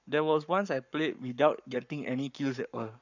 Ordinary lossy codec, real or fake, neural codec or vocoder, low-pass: none; fake; codec, 44.1 kHz, 7.8 kbps, Pupu-Codec; 7.2 kHz